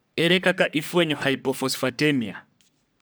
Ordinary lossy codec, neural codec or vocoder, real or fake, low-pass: none; codec, 44.1 kHz, 3.4 kbps, Pupu-Codec; fake; none